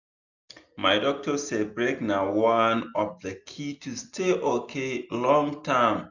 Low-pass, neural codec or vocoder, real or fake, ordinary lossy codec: 7.2 kHz; none; real; none